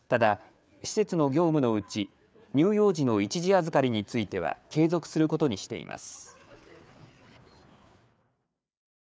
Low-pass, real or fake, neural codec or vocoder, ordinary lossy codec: none; fake; codec, 16 kHz, 4 kbps, FreqCodec, larger model; none